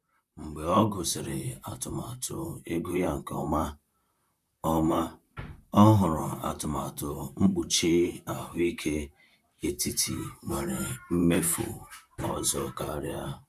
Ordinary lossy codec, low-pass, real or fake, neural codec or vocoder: none; 14.4 kHz; fake; vocoder, 44.1 kHz, 128 mel bands, Pupu-Vocoder